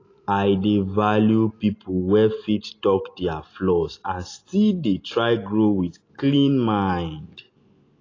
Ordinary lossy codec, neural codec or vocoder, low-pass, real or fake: AAC, 32 kbps; none; 7.2 kHz; real